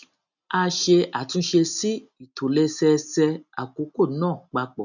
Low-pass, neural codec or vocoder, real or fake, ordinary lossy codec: 7.2 kHz; none; real; none